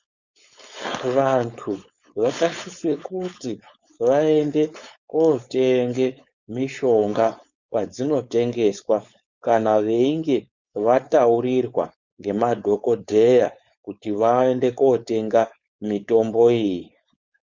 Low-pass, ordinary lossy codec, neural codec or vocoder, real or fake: 7.2 kHz; Opus, 64 kbps; codec, 16 kHz, 4.8 kbps, FACodec; fake